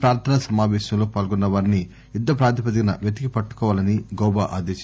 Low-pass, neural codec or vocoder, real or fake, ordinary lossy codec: none; none; real; none